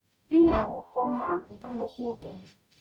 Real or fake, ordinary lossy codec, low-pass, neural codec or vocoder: fake; none; 19.8 kHz; codec, 44.1 kHz, 0.9 kbps, DAC